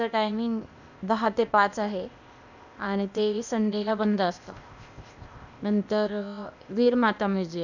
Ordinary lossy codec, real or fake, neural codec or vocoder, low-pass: none; fake; codec, 16 kHz, 0.8 kbps, ZipCodec; 7.2 kHz